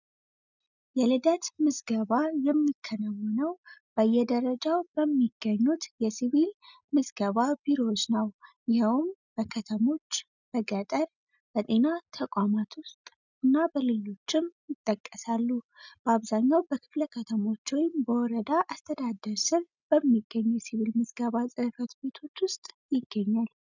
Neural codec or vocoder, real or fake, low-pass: none; real; 7.2 kHz